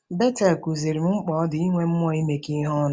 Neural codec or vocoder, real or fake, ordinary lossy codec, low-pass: none; real; none; none